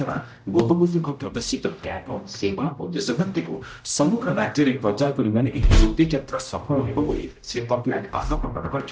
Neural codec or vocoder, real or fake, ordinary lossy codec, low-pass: codec, 16 kHz, 0.5 kbps, X-Codec, HuBERT features, trained on general audio; fake; none; none